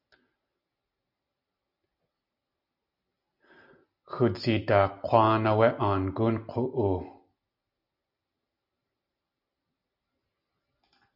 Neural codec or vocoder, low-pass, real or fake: none; 5.4 kHz; real